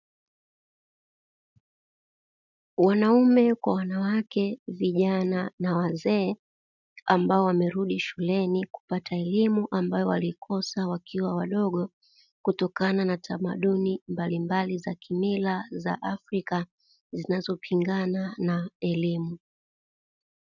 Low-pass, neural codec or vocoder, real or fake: 7.2 kHz; none; real